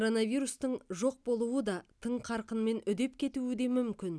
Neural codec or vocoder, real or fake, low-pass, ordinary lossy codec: none; real; none; none